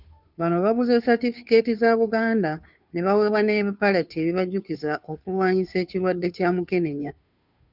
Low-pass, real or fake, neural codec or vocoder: 5.4 kHz; fake; codec, 16 kHz, 2 kbps, FunCodec, trained on Chinese and English, 25 frames a second